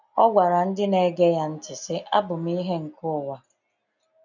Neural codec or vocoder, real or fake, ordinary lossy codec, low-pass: none; real; none; 7.2 kHz